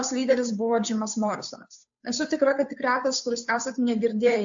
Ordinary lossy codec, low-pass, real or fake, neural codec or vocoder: AAC, 64 kbps; 7.2 kHz; fake; codec, 16 kHz, 2 kbps, FunCodec, trained on Chinese and English, 25 frames a second